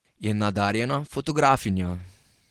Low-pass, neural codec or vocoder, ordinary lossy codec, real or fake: 19.8 kHz; none; Opus, 16 kbps; real